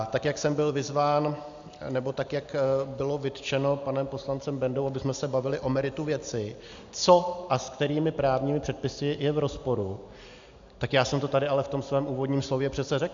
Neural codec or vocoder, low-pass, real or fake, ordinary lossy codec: none; 7.2 kHz; real; AAC, 64 kbps